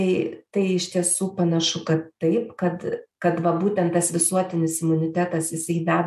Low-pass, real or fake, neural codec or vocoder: 14.4 kHz; real; none